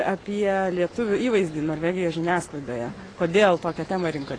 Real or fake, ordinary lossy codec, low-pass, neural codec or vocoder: fake; AAC, 32 kbps; 9.9 kHz; codec, 44.1 kHz, 7.8 kbps, Pupu-Codec